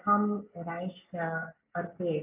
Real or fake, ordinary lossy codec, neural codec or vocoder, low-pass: real; AAC, 32 kbps; none; 3.6 kHz